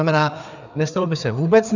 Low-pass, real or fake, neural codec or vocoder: 7.2 kHz; fake; codec, 16 kHz, 4 kbps, FreqCodec, larger model